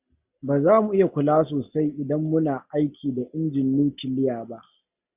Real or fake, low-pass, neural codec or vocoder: real; 3.6 kHz; none